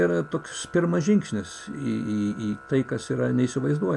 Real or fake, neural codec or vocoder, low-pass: real; none; 10.8 kHz